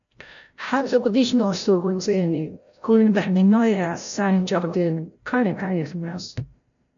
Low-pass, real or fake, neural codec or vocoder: 7.2 kHz; fake; codec, 16 kHz, 0.5 kbps, FreqCodec, larger model